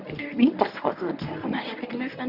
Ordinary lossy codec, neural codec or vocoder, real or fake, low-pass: none; codec, 24 kHz, 0.9 kbps, WavTokenizer, medium speech release version 1; fake; 5.4 kHz